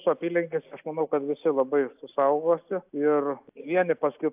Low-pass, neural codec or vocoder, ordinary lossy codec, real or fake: 3.6 kHz; none; AAC, 32 kbps; real